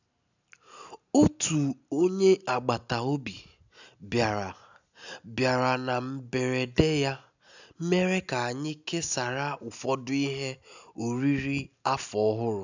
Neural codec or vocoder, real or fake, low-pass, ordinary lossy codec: none; real; 7.2 kHz; none